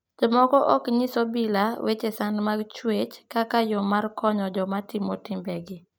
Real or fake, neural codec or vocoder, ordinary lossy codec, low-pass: real; none; none; none